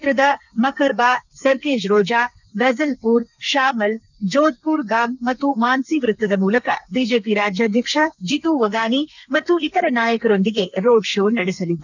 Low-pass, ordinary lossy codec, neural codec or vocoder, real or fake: 7.2 kHz; none; codec, 32 kHz, 1.9 kbps, SNAC; fake